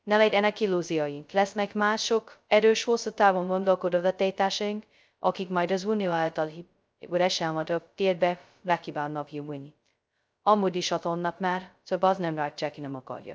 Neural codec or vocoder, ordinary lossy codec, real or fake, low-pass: codec, 16 kHz, 0.2 kbps, FocalCodec; none; fake; none